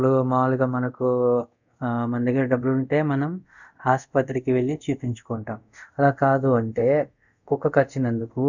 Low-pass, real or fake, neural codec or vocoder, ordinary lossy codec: 7.2 kHz; fake; codec, 24 kHz, 0.5 kbps, DualCodec; none